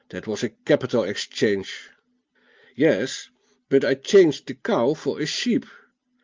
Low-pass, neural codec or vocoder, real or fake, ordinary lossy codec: 7.2 kHz; none; real; Opus, 32 kbps